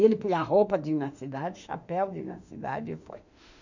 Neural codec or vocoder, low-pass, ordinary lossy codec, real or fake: autoencoder, 48 kHz, 32 numbers a frame, DAC-VAE, trained on Japanese speech; 7.2 kHz; none; fake